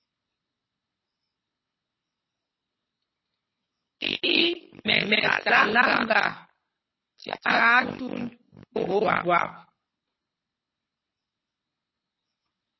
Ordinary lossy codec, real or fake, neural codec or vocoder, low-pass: MP3, 24 kbps; fake; codec, 24 kHz, 6 kbps, HILCodec; 7.2 kHz